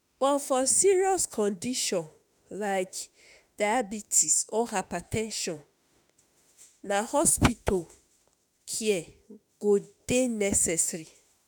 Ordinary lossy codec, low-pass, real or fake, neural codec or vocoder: none; none; fake; autoencoder, 48 kHz, 32 numbers a frame, DAC-VAE, trained on Japanese speech